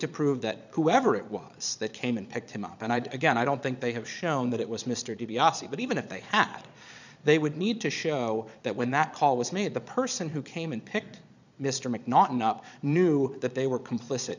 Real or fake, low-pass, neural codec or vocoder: fake; 7.2 kHz; vocoder, 22.05 kHz, 80 mel bands, Vocos